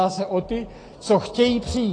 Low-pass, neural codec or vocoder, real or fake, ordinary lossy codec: 9.9 kHz; none; real; AAC, 32 kbps